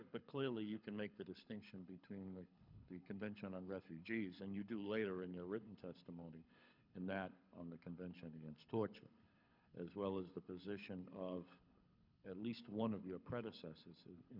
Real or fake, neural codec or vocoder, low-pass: fake; codec, 24 kHz, 6 kbps, HILCodec; 5.4 kHz